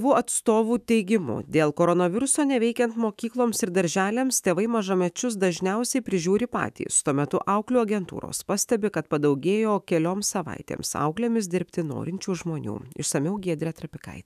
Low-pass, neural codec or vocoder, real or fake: 14.4 kHz; autoencoder, 48 kHz, 128 numbers a frame, DAC-VAE, trained on Japanese speech; fake